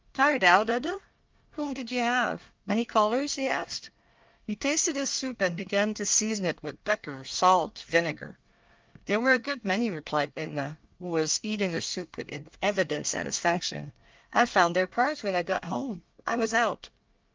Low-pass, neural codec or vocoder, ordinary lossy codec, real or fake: 7.2 kHz; codec, 24 kHz, 1 kbps, SNAC; Opus, 24 kbps; fake